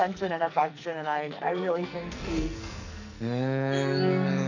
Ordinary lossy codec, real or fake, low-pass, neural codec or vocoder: none; fake; 7.2 kHz; codec, 44.1 kHz, 2.6 kbps, SNAC